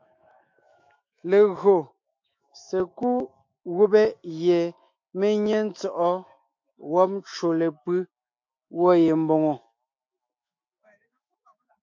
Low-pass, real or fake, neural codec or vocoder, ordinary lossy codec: 7.2 kHz; fake; autoencoder, 48 kHz, 128 numbers a frame, DAC-VAE, trained on Japanese speech; MP3, 48 kbps